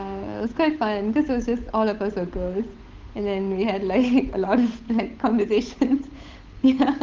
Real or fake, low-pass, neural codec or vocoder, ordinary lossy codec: fake; 7.2 kHz; codec, 16 kHz, 8 kbps, FunCodec, trained on Chinese and English, 25 frames a second; Opus, 32 kbps